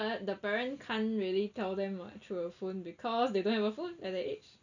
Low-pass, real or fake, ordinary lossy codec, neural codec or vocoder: 7.2 kHz; real; none; none